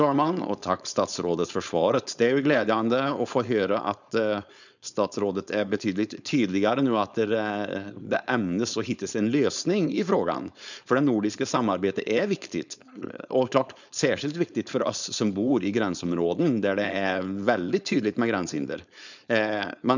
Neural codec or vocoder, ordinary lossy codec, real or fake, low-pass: codec, 16 kHz, 4.8 kbps, FACodec; none; fake; 7.2 kHz